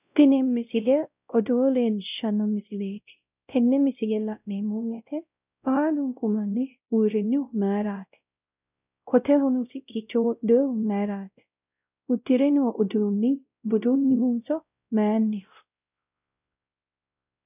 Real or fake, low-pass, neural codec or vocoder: fake; 3.6 kHz; codec, 16 kHz, 0.5 kbps, X-Codec, WavLM features, trained on Multilingual LibriSpeech